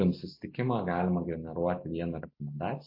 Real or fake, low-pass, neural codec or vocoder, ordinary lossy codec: real; 5.4 kHz; none; MP3, 32 kbps